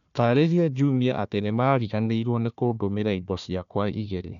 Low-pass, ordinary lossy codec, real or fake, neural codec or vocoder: 7.2 kHz; none; fake; codec, 16 kHz, 1 kbps, FunCodec, trained on Chinese and English, 50 frames a second